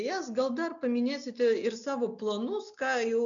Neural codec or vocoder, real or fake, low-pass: none; real; 7.2 kHz